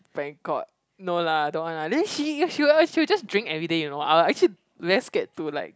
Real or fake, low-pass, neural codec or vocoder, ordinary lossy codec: real; none; none; none